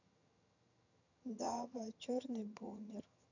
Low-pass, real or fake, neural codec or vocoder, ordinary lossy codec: 7.2 kHz; fake; vocoder, 22.05 kHz, 80 mel bands, HiFi-GAN; none